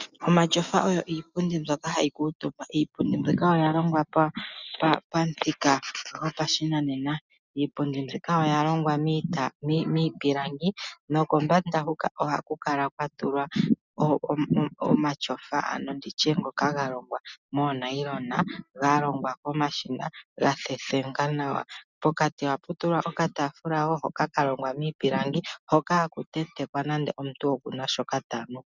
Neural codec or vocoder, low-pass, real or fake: none; 7.2 kHz; real